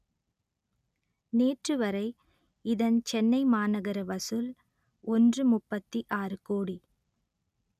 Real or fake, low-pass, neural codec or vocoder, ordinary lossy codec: real; 14.4 kHz; none; none